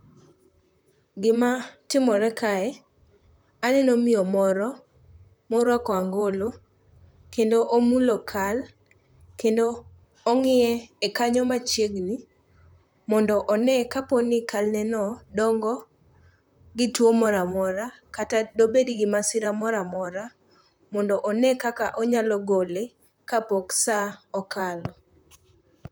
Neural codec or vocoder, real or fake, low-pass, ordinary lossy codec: vocoder, 44.1 kHz, 128 mel bands, Pupu-Vocoder; fake; none; none